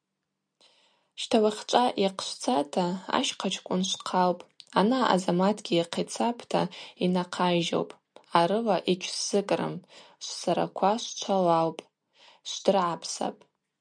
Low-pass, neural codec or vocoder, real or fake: 9.9 kHz; none; real